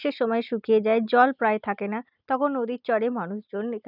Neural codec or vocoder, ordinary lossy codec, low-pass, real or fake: none; none; 5.4 kHz; real